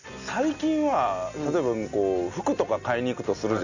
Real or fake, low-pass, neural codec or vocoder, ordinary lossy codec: real; 7.2 kHz; none; none